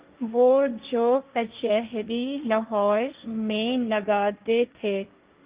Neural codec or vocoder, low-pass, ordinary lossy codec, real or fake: codec, 16 kHz, 1.1 kbps, Voila-Tokenizer; 3.6 kHz; Opus, 32 kbps; fake